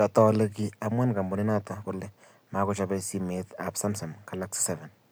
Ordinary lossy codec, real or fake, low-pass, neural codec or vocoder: none; real; none; none